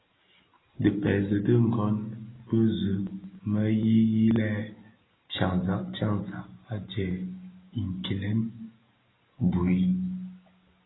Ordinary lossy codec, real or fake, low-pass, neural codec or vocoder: AAC, 16 kbps; real; 7.2 kHz; none